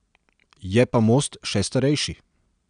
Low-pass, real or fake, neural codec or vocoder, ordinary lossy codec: 9.9 kHz; real; none; none